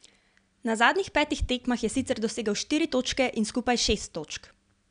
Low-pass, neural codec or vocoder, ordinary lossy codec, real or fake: 9.9 kHz; none; none; real